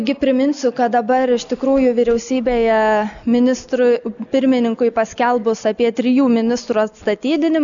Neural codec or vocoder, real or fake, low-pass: none; real; 7.2 kHz